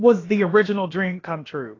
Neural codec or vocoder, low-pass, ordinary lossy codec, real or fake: codec, 16 kHz, about 1 kbps, DyCAST, with the encoder's durations; 7.2 kHz; AAC, 32 kbps; fake